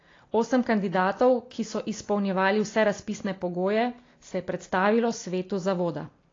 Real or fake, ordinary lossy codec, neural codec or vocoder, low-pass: real; AAC, 32 kbps; none; 7.2 kHz